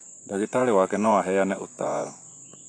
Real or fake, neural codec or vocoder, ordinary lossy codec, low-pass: fake; vocoder, 24 kHz, 100 mel bands, Vocos; AAC, 48 kbps; 9.9 kHz